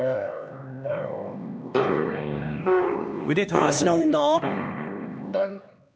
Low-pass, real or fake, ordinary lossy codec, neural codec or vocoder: none; fake; none; codec, 16 kHz, 2 kbps, X-Codec, HuBERT features, trained on LibriSpeech